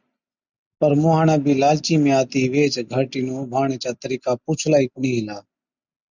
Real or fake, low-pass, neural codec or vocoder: real; 7.2 kHz; none